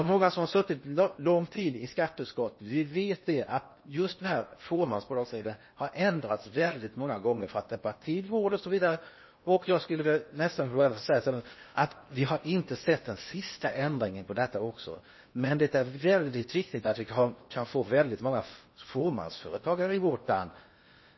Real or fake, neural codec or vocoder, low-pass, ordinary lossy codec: fake; codec, 16 kHz in and 24 kHz out, 0.8 kbps, FocalCodec, streaming, 65536 codes; 7.2 kHz; MP3, 24 kbps